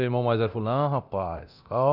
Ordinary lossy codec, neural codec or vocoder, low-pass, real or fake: none; codec, 24 kHz, 0.9 kbps, DualCodec; 5.4 kHz; fake